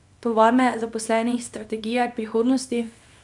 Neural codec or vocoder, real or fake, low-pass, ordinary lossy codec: codec, 24 kHz, 0.9 kbps, WavTokenizer, small release; fake; 10.8 kHz; none